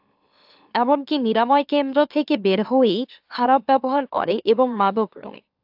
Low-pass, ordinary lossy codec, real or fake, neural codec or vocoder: 5.4 kHz; AAC, 48 kbps; fake; autoencoder, 44.1 kHz, a latent of 192 numbers a frame, MeloTTS